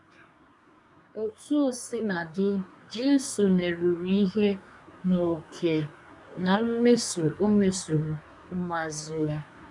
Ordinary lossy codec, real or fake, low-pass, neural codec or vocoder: none; fake; 10.8 kHz; codec, 24 kHz, 1 kbps, SNAC